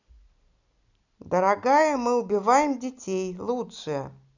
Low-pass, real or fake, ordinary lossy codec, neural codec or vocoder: 7.2 kHz; real; none; none